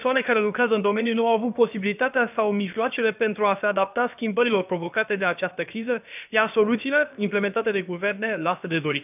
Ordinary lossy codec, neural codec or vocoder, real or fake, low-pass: none; codec, 16 kHz, about 1 kbps, DyCAST, with the encoder's durations; fake; 3.6 kHz